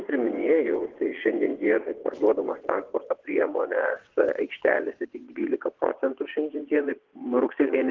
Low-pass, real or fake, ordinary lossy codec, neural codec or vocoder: 7.2 kHz; fake; Opus, 16 kbps; vocoder, 22.05 kHz, 80 mel bands, WaveNeXt